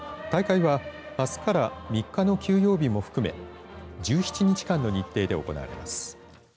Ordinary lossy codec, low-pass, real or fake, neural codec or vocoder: none; none; real; none